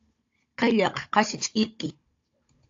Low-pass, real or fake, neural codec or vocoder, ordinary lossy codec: 7.2 kHz; fake; codec, 16 kHz, 16 kbps, FunCodec, trained on Chinese and English, 50 frames a second; AAC, 48 kbps